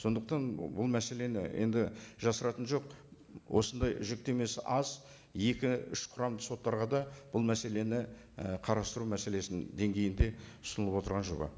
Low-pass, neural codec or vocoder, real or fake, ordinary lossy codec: none; none; real; none